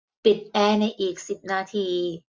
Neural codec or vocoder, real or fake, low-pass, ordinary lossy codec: none; real; none; none